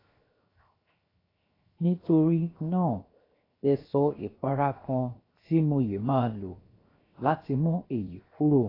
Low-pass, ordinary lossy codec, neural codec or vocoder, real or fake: 5.4 kHz; AAC, 24 kbps; codec, 16 kHz, 0.7 kbps, FocalCodec; fake